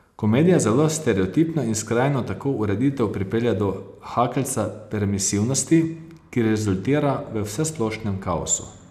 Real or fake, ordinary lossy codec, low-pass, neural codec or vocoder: real; none; 14.4 kHz; none